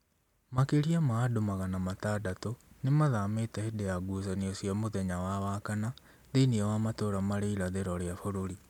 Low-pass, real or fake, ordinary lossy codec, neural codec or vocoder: 19.8 kHz; real; MP3, 96 kbps; none